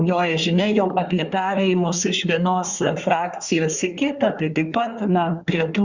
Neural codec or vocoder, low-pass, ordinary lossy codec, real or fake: codec, 24 kHz, 1 kbps, SNAC; 7.2 kHz; Opus, 64 kbps; fake